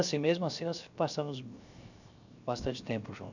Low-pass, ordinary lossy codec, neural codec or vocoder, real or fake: 7.2 kHz; none; codec, 16 kHz, 0.7 kbps, FocalCodec; fake